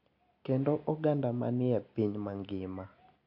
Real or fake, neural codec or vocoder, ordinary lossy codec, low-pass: real; none; AAC, 48 kbps; 5.4 kHz